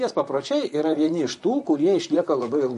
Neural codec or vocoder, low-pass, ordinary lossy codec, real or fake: vocoder, 44.1 kHz, 128 mel bands, Pupu-Vocoder; 14.4 kHz; MP3, 48 kbps; fake